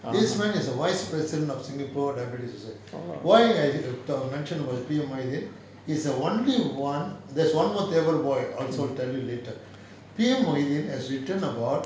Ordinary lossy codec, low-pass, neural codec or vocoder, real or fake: none; none; none; real